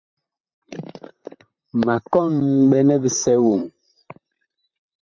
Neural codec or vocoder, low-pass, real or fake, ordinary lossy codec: vocoder, 44.1 kHz, 128 mel bands, Pupu-Vocoder; 7.2 kHz; fake; MP3, 64 kbps